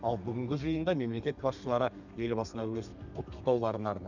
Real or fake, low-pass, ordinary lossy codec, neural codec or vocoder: fake; 7.2 kHz; none; codec, 32 kHz, 1.9 kbps, SNAC